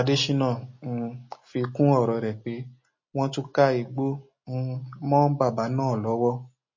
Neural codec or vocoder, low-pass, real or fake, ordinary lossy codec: none; 7.2 kHz; real; MP3, 32 kbps